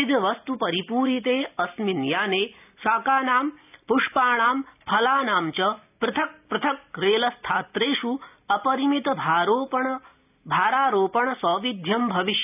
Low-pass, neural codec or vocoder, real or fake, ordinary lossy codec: 3.6 kHz; none; real; none